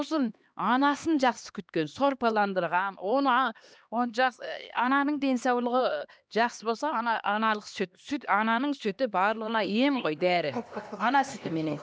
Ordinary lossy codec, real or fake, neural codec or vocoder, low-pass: none; fake; codec, 16 kHz, 2 kbps, X-Codec, HuBERT features, trained on LibriSpeech; none